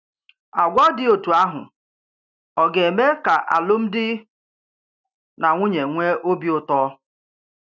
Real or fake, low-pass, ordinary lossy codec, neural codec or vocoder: real; 7.2 kHz; none; none